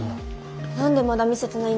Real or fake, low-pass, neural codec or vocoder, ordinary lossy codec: real; none; none; none